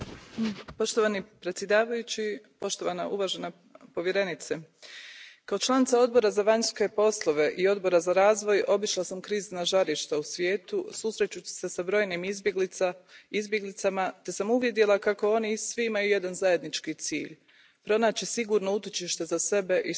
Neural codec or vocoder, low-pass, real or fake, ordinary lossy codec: none; none; real; none